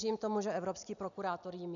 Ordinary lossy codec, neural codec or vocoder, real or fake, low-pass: MP3, 96 kbps; none; real; 7.2 kHz